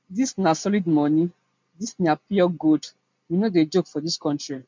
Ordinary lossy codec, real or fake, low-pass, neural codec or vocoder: MP3, 64 kbps; real; 7.2 kHz; none